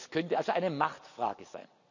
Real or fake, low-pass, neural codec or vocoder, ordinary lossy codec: real; 7.2 kHz; none; none